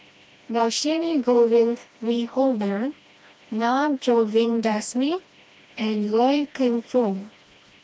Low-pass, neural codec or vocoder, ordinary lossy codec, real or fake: none; codec, 16 kHz, 1 kbps, FreqCodec, smaller model; none; fake